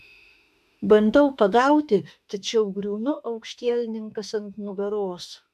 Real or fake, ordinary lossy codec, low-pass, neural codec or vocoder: fake; MP3, 96 kbps; 14.4 kHz; autoencoder, 48 kHz, 32 numbers a frame, DAC-VAE, trained on Japanese speech